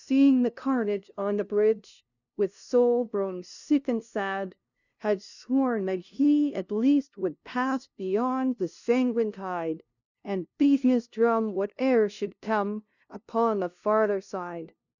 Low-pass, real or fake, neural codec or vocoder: 7.2 kHz; fake; codec, 16 kHz, 0.5 kbps, FunCodec, trained on LibriTTS, 25 frames a second